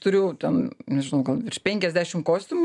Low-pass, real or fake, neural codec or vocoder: 10.8 kHz; real; none